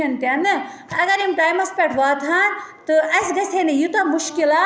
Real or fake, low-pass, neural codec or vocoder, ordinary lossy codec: real; none; none; none